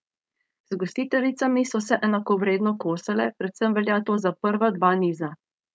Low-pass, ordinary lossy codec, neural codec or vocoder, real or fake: none; none; codec, 16 kHz, 4.8 kbps, FACodec; fake